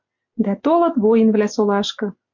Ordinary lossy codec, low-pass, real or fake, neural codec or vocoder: MP3, 48 kbps; 7.2 kHz; real; none